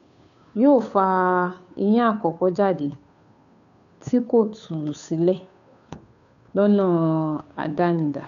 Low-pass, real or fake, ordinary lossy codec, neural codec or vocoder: 7.2 kHz; fake; none; codec, 16 kHz, 2 kbps, FunCodec, trained on Chinese and English, 25 frames a second